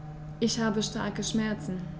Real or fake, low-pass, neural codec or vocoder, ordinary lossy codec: real; none; none; none